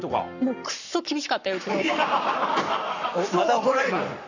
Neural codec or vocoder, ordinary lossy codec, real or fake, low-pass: codec, 44.1 kHz, 7.8 kbps, Pupu-Codec; none; fake; 7.2 kHz